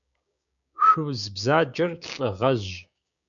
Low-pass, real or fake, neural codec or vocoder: 7.2 kHz; fake; codec, 16 kHz, 6 kbps, DAC